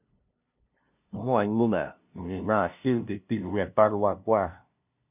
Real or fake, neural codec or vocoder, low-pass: fake; codec, 16 kHz, 0.5 kbps, FunCodec, trained on LibriTTS, 25 frames a second; 3.6 kHz